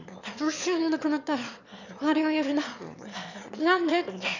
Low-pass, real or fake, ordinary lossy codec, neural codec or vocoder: 7.2 kHz; fake; none; autoencoder, 22.05 kHz, a latent of 192 numbers a frame, VITS, trained on one speaker